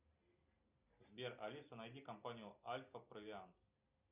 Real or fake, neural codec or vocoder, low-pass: real; none; 3.6 kHz